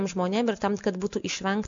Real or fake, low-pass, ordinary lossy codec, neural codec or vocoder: real; 7.2 kHz; MP3, 64 kbps; none